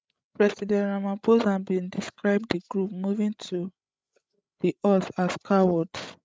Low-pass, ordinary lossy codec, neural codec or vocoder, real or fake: none; none; codec, 16 kHz, 16 kbps, FreqCodec, larger model; fake